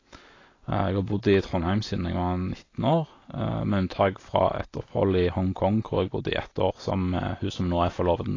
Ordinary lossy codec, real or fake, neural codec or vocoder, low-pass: AAC, 32 kbps; real; none; 7.2 kHz